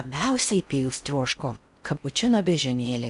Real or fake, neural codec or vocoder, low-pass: fake; codec, 16 kHz in and 24 kHz out, 0.6 kbps, FocalCodec, streaming, 4096 codes; 10.8 kHz